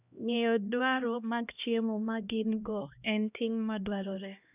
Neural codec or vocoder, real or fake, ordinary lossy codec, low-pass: codec, 16 kHz, 1 kbps, X-Codec, HuBERT features, trained on LibriSpeech; fake; none; 3.6 kHz